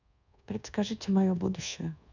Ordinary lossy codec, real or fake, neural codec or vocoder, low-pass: none; fake; codec, 24 kHz, 1.2 kbps, DualCodec; 7.2 kHz